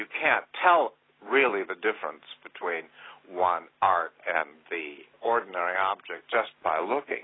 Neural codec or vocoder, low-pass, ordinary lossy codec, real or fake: none; 7.2 kHz; AAC, 16 kbps; real